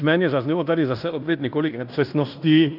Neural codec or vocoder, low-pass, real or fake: codec, 16 kHz in and 24 kHz out, 0.9 kbps, LongCat-Audio-Codec, fine tuned four codebook decoder; 5.4 kHz; fake